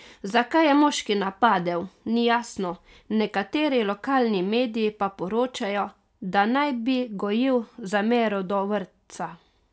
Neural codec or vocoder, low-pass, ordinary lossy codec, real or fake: none; none; none; real